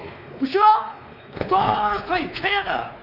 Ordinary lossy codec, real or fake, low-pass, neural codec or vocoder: none; fake; 5.4 kHz; codec, 16 kHz, 2 kbps, X-Codec, WavLM features, trained on Multilingual LibriSpeech